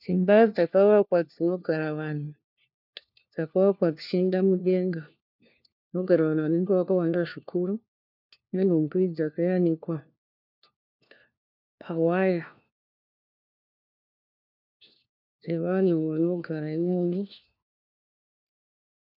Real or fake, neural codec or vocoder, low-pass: fake; codec, 16 kHz, 1 kbps, FunCodec, trained on LibriTTS, 50 frames a second; 5.4 kHz